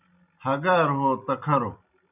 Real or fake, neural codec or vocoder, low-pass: real; none; 3.6 kHz